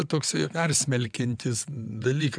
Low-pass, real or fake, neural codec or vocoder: 9.9 kHz; fake; vocoder, 22.05 kHz, 80 mel bands, WaveNeXt